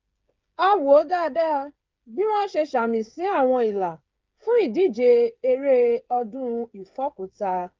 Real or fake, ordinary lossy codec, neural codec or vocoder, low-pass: fake; Opus, 24 kbps; codec, 16 kHz, 8 kbps, FreqCodec, smaller model; 7.2 kHz